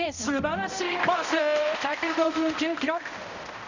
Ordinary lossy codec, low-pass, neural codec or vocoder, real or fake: none; 7.2 kHz; codec, 16 kHz, 0.5 kbps, X-Codec, HuBERT features, trained on balanced general audio; fake